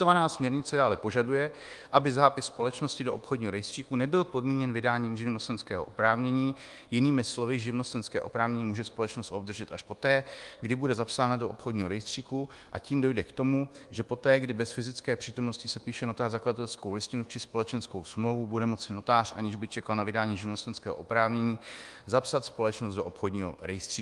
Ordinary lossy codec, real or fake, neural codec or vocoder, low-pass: Opus, 24 kbps; fake; codec, 24 kHz, 1.2 kbps, DualCodec; 10.8 kHz